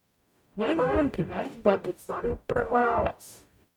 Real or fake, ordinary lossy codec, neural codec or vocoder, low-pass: fake; none; codec, 44.1 kHz, 0.9 kbps, DAC; 19.8 kHz